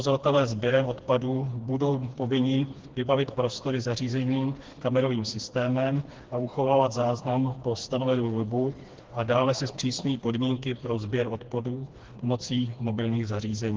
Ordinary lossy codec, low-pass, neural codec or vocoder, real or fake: Opus, 16 kbps; 7.2 kHz; codec, 16 kHz, 2 kbps, FreqCodec, smaller model; fake